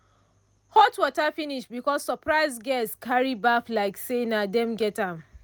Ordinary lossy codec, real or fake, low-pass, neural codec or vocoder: none; real; none; none